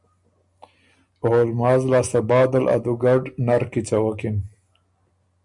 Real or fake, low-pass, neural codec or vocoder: real; 10.8 kHz; none